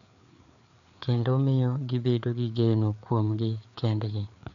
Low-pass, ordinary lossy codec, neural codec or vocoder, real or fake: 7.2 kHz; none; codec, 16 kHz, 4 kbps, FreqCodec, larger model; fake